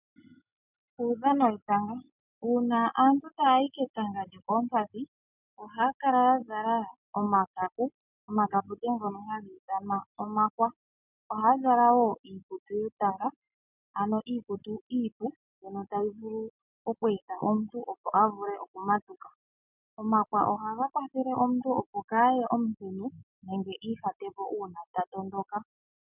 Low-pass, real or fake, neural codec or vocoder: 3.6 kHz; real; none